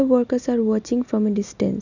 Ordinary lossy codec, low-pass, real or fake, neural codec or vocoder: none; 7.2 kHz; real; none